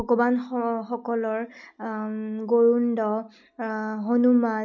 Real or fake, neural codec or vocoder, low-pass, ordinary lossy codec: real; none; 7.2 kHz; none